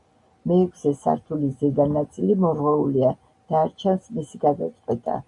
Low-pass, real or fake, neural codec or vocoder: 10.8 kHz; real; none